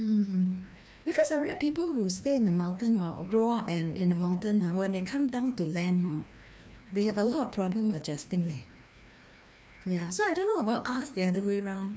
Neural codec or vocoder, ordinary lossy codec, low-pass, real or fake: codec, 16 kHz, 1 kbps, FreqCodec, larger model; none; none; fake